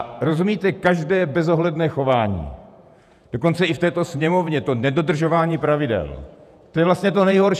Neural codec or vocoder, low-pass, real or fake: vocoder, 48 kHz, 128 mel bands, Vocos; 14.4 kHz; fake